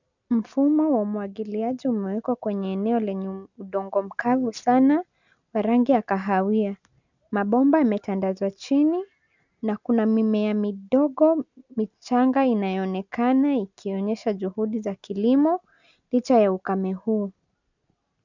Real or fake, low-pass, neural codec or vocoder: real; 7.2 kHz; none